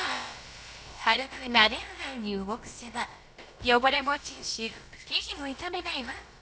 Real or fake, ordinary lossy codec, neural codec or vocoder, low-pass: fake; none; codec, 16 kHz, about 1 kbps, DyCAST, with the encoder's durations; none